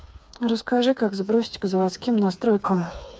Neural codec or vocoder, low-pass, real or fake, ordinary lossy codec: codec, 16 kHz, 4 kbps, FreqCodec, smaller model; none; fake; none